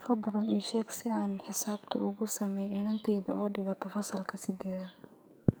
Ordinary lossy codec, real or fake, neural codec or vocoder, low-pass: none; fake; codec, 44.1 kHz, 2.6 kbps, SNAC; none